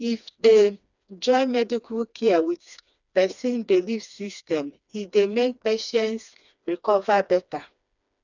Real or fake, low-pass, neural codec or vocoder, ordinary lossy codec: fake; 7.2 kHz; codec, 16 kHz, 2 kbps, FreqCodec, smaller model; none